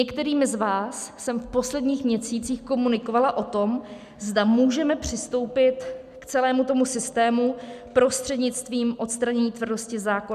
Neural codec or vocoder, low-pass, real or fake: none; 14.4 kHz; real